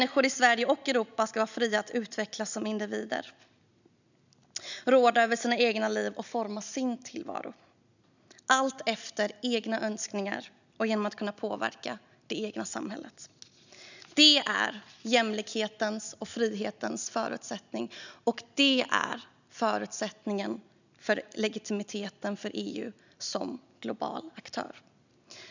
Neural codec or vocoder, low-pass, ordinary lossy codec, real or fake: none; 7.2 kHz; none; real